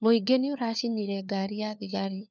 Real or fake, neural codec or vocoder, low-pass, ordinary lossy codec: fake; codec, 16 kHz, 4 kbps, FunCodec, trained on LibriTTS, 50 frames a second; none; none